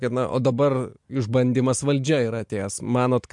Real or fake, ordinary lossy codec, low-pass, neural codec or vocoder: real; MP3, 64 kbps; 10.8 kHz; none